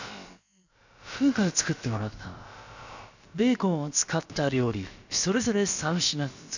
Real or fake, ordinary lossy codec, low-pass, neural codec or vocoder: fake; none; 7.2 kHz; codec, 16 kHz, about 1 kbps, DyCAST, with the encoder's durations